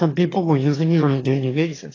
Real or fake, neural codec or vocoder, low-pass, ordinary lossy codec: fake; autoencoder, 22.05 kHz, a latent of 192 numbers a frame, VITS, trained on one speaker; 7.2 kHz; AAC, 32 kbps